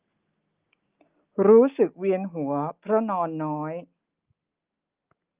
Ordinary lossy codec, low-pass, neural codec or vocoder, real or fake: Opus, 24 kbps; 3.6 kHz; none; real